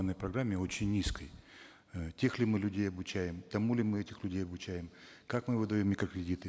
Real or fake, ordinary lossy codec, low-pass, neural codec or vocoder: real; none; none; none